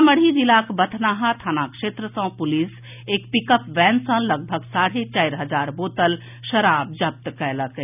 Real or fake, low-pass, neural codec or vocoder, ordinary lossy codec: real; 3.6 kHz; none; none